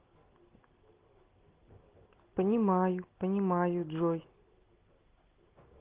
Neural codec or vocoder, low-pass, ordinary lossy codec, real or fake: none; 3.6 kHz; Opus, 16 kbps; real